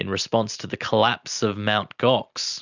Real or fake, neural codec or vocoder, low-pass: real; none; 7.2 kHz